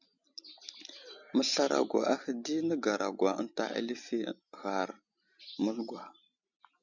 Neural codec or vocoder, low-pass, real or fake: none; 7.2 kHz; real